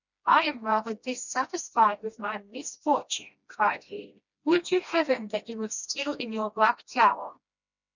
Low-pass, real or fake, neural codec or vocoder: 7.2 kHz; fake; codec, 16 kHz, 1 kbps, FreqCodec, smaller model